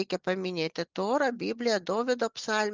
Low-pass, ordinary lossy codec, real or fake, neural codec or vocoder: 7.2 kHz; Opus, 24 kbps; real; none